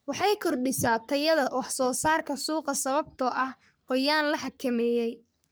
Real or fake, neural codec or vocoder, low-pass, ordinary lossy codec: fake; codec, 44.1 kHz, 3.4 kbps, Pupu-Codec; none; none